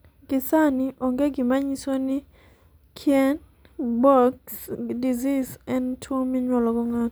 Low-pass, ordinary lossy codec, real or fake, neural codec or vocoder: none; none; real; none